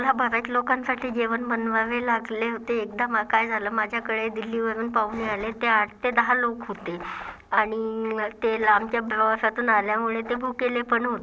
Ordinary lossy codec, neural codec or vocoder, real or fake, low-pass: none; none; real; none